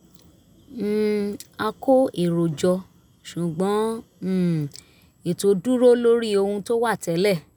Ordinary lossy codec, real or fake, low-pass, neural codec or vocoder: none; real; none; none